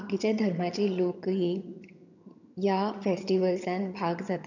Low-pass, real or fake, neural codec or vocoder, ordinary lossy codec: 7.2 kHz; fake; vocoder, 22.05 kHz, 80 mel bands, HiFi-GAN; none